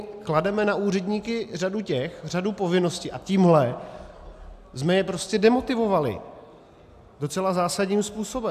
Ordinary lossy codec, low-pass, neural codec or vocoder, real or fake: AAC, 96 kbps; 14.4 kHz; none; real